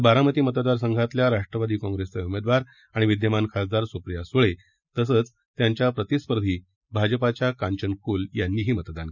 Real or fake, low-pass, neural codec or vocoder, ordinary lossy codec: real; 7.2 kHz; none; none